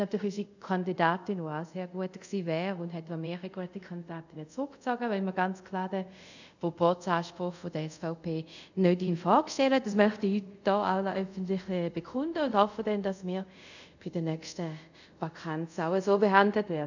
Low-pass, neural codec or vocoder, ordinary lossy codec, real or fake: 7.2 kHz; codec, 24 kHz, 0.5 kbps, DualCodec; none; fake